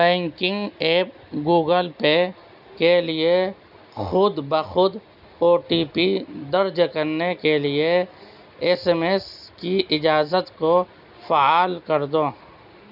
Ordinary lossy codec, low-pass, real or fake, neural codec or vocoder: none; 5.4 kHz; real; none